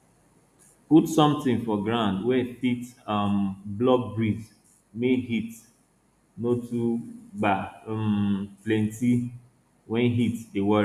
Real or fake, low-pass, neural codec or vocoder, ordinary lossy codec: real; 14.4 kHz; none; none